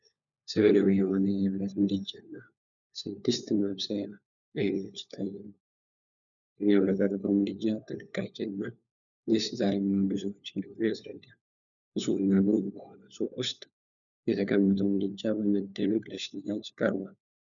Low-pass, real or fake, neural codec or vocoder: 7.2 kHz; fake; codec, 16 kHz, 4 kbps, FunCodec, trained on LibriTTS, 50 frames a second